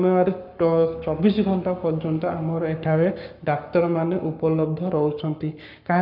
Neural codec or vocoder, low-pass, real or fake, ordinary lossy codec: codec, 44.1 kHz, 7.8 kbps, Pupu-Codec; 5.4 kHz; fake; none